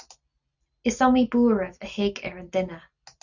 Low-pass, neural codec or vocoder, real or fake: 7.2 kHz; none; real